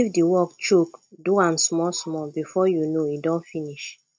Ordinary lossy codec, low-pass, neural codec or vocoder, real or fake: none; none; none; real